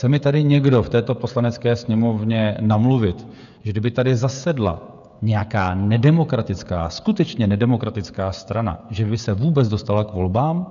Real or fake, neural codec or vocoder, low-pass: fake; codec, 16 kHz, 16 kbps, FreqCodec, smaller model; 7.2 kHz